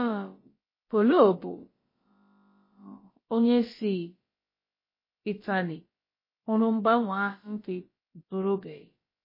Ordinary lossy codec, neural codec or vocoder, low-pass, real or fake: MP3, 24 kbps; codec, 16 kHz, about 1 kbps, DyCAST, with the encoder's durations; 5.4 kHz; fake